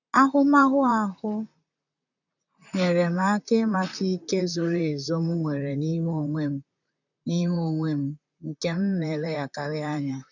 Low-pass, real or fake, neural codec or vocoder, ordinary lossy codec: 7.2 kHz; fake; vocoder, 44.1 kHz, 128 mel bands, Pupu-Vocoder; none